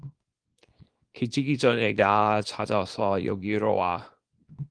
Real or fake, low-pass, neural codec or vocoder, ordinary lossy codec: fake; 9.9 kHz; codec, 24 kHz, 0.9 kbps, WavTokenizer, small release; Opus, 24 kbps